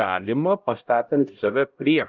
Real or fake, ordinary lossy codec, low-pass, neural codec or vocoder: fake; Opus, 32 kbps; 7.2 kHz; codec, 16 kHz, 0.5 kbps, X-Codec, HuBERT features, trained on LibriSpeech